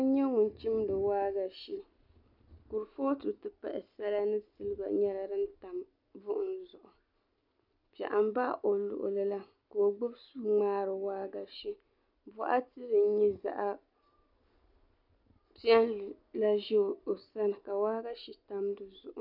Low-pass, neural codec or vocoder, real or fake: 5.4 kHz; none; real